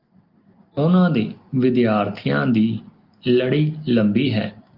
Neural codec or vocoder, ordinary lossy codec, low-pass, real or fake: none; Opus, 24 kbps; 5.4 kHz; real